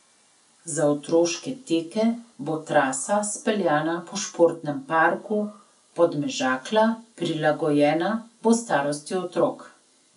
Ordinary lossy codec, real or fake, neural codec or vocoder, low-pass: none; real; none; 10.8 kHz